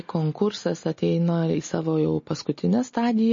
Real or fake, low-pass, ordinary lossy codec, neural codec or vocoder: real; 7.2 kHz; MP3, 32 kbps; none